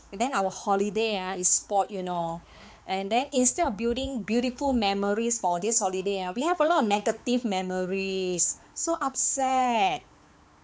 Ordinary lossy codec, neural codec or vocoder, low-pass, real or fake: none; codec, 16 kHz, 4 kbps, X-Codec, HuBERT features, trained on balanced general audio; none; fake